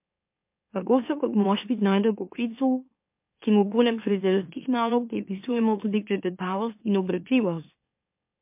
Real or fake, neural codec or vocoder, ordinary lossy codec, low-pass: fake; autoencoder, 44.1 kHz, a latent of 192 numbers a frame, MeloTTS; MP3, 32 kbps; 3.6 kHz